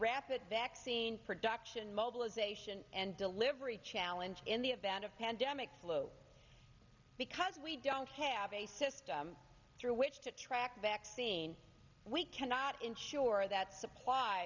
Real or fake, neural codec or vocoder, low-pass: real; none; 7.2 kHz